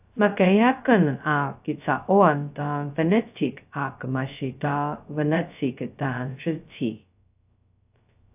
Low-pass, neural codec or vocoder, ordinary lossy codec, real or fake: 3.6 kHz; codec, 16 kHz, 0.2 kbps, FocalCodec; AAC, 32 kbps; fake